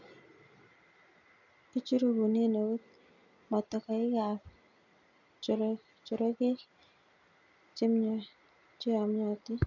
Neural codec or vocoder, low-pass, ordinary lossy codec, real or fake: none; 7.2 kHz; none; real